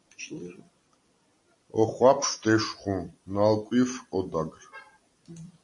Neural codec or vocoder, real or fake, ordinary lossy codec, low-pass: vocoder, 44.1 kHz, 128 mel bands every 256 samples, BigVGAN v2; fake; MP3, 48 kbps; 10.8 kHz